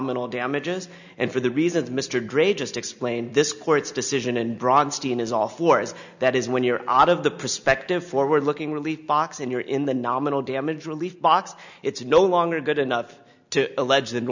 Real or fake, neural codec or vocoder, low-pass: real; none; 7.2 kHz